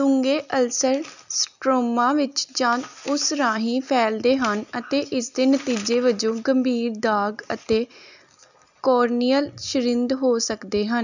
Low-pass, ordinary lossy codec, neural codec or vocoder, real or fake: 7.2 kHz; none; none; real